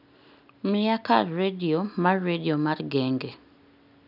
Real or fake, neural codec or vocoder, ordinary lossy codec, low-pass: real; none; none; 5.4 kHz